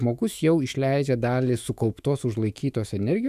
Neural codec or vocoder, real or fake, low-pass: autoencoder, 48 kHz, 128 numbers a frame, DAC-VAE, trained on Japanese speech; fake; 14.4 kHz